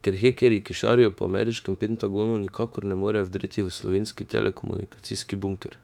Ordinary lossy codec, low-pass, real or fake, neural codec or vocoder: none; 19.8 kHz; fake; autoencoder, 48 kHz, 32 numbers a frame, DAC-VAE, trained on Japanese speech